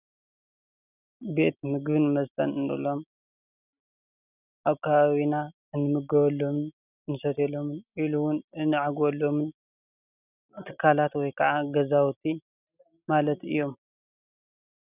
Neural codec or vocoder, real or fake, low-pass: none; real; 3.6 kHz